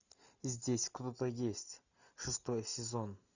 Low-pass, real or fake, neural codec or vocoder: 7.2 kHz; real; none